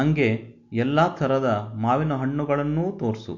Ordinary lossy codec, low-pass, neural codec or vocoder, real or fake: MP3, 48 kbps; 7.2 kHz; none; real